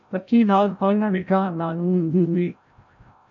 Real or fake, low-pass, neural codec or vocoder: fake; 7.2 kHz; codec, 16 kHz, 0.5 kbps, FreqCodec, larger model